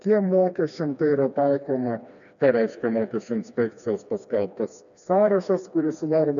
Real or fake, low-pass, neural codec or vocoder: fake; 7.2 kHz; codec, 16 kHz, 2 kbps, FreqCodec, smaller model